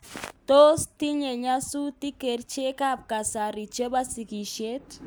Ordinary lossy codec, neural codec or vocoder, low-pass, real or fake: none; none; none; real